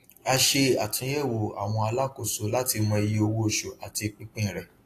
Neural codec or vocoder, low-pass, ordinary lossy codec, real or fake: none; 14.4 kHz; AAC, 48 kbps; real